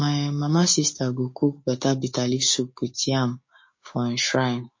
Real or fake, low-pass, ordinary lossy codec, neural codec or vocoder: fake; 7.2 kHz; MP3, 32 kbps; codec, 16 kHz, 6 kbps, DAC